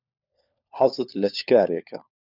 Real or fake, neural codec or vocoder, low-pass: fake; codec, 16 kHz, 4 kbps, FunCodec, trained on LibriTTS, 50 frames a second; 5.4 kHz